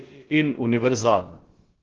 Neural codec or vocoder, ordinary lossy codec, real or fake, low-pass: codec, 16 kHz, about 1 kbps, DyCAST, with the encoder's durations; Opus, 16 kbps; fake; 7.2 kHz